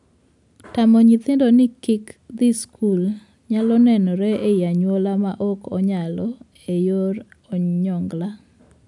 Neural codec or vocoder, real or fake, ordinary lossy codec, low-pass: none; real; none; 10.8 kHz